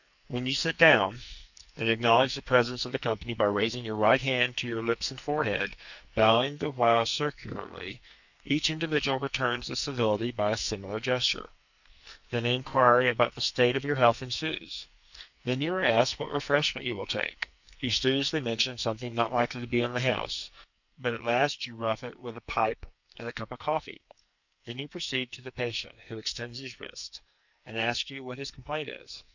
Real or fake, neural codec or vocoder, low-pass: fake; codec, 44.1 kHz, 2.6 kbps, SNAC; 7.2 kHz